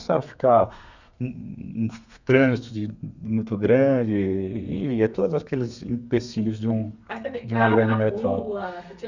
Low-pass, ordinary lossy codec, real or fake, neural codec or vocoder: 7.2 kHz; none; fake; codec, 32 kHz, 1.9 kbps, SNAC